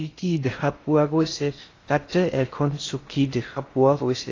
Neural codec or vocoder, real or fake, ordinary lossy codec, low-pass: codec, 16 kHz in and 24 kHz out, 0.6 kbps, FocalCodec, streaming, 2048 codes; fake; AAC, 32 kbps; 7.2 kHz